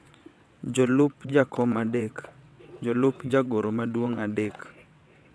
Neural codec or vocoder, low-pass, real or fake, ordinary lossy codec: vocoder, 22.05 kHz, 80 mel bands, WaveNeXt; none; fake; none